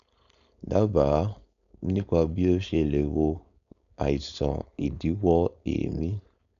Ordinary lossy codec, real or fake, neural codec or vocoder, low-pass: none; fake; codec, 16 kHz, 4.8 kbps, FACodec; 7.2 kHz